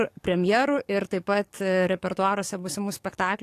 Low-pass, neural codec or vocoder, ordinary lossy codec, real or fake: 14.4 kHz; codec, 44.1 kHz, 7.8 kbps, DAC; AAC, 64 kbps; fake